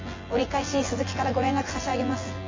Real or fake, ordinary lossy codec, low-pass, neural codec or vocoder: fake; MP3, 32 kbps; 7.2 kHz; vocoder, 24 kHz, 100 mel bands, Vocos